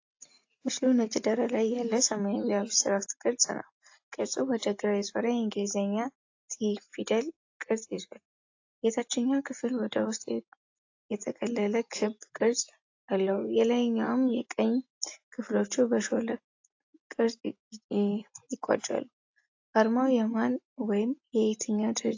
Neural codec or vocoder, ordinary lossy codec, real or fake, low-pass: none; AAC, 48 kbps; real; 7.2 kHz